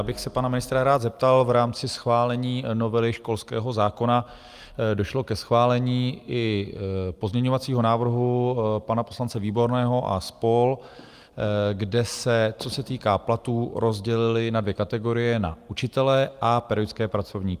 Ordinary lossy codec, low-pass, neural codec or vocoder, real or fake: Opus, 32 kbps; 14.4 kHz; none; real